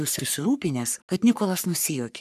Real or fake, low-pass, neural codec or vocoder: fake; 14.4 kHz; codec, 44.1 kHz, 3.4 kbps, Pupu-Codec